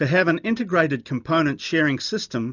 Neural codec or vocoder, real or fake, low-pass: none; real; 7.2 kHz